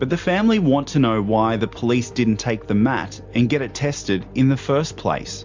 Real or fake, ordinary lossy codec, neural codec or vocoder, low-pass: real; MP3, 64 kbps; none; 7.2 kHz